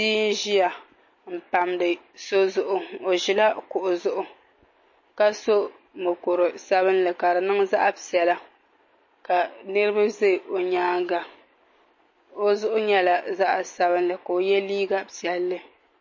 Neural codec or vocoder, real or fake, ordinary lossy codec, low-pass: none; real; MP3, 32 kbps; 7.2 kHz